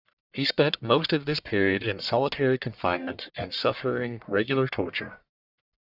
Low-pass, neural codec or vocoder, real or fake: 5.4 kHz; codec, 44.1 kHz, 1.7 kbps, Pupu-Codec; fake